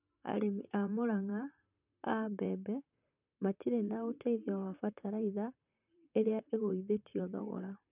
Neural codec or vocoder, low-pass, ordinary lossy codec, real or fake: vocoder, 44.1 kHz, 128 mel bands, Pupu-Vocoder; 3.6 kHz; none; fake